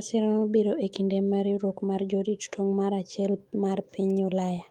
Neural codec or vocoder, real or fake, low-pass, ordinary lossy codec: autoencoder, 48 kHz, 128 numbers a frame, DAC-VAE, trained on Japanese speech; fake; 19.8 kHz; Opus, 24 kbps